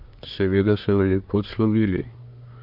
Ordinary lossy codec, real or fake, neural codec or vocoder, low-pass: MP3, 48 kbps; fake; codec, 24 kHz, 1 kbps, SNAC; 5.4 kHz